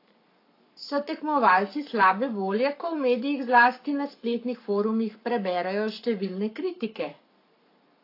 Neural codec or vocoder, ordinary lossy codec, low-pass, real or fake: codec, 44.1 kHz, 7.8 kbps, Pupu-Codec; AAC, 32 kbps; 5.4 kHz; fake